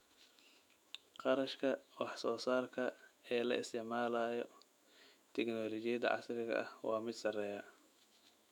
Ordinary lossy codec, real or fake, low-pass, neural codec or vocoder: none; fake; 19.8 kHz; autoencoder, 48 kHz, 128 numbers a frame, DAC-VAE, trained on Japanese speech